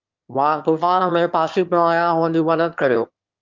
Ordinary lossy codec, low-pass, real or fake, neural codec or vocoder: Opus, 24 kbps; 7.2 kHz; fake; autoencoder, 22.05 kHz, a latent of 192 numbers a frame, VITS, trained on one speaker